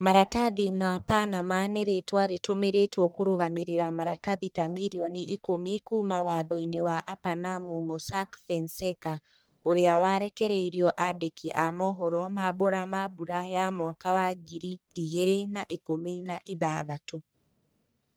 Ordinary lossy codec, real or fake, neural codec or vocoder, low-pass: none; fake; codec, 44.1 kHz, 1.7 kbps, Pupu-Codec; none